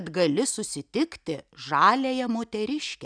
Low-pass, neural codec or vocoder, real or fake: 9.9 kHz; none; real